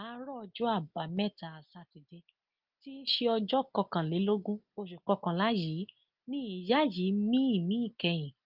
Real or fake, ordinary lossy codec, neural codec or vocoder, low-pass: real; Opus, 32 kbps; none; 5.4 kHz